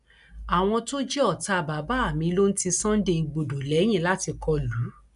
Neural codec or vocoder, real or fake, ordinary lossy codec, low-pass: none; real; none; 10.8 kHz